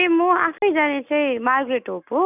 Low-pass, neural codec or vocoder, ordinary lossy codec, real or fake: 3.6 kHz; none; none; real